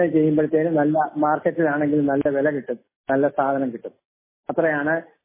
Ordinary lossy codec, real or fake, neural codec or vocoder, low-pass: MP3, 16 kbps; real; none; 3.6 kHz